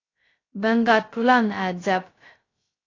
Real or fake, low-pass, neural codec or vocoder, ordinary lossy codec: fake; 7.2 kHz; codec, 16 kHz, 0.2 kbps, FocalCodec; AAC, 32 kbps